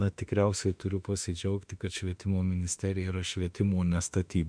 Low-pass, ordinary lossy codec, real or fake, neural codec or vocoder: 9.9 kHz; MP3, 64 kbps; fake; autoencoder, 48 kHz, 32 numbers a frame, DAC-VAE, trained on Japanese speech